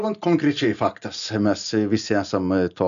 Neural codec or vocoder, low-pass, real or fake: none; 7.2 kHz; real